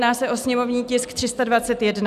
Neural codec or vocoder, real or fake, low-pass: none; real; 14.4 kHz